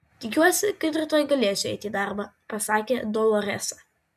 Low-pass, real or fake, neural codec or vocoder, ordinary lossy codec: 14.4 kHz; real; none; MP3, 96 kbps